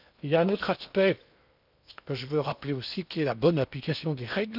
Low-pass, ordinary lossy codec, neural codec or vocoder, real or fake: 5.4 kHz; none; codec, 16 kHz in and 24 kHz out, 0.8 kbps, FocalCodec, streaming, 65536 codes; fake